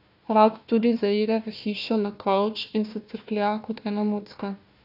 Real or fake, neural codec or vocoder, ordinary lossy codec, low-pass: fake; codec, 16 kHz, 1 kbps, FunCodec, trained on Chinese and English, 50 frames a second; none; 5.4 kHz